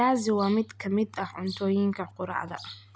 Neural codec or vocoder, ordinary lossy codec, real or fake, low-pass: none; none; real; none